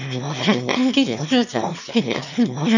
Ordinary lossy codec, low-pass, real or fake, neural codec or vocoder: none; 7.2 kHz; fake; autoencoder, 22.05 kHz, a latent of 192 numbers a frame, VITS, trained on one speaker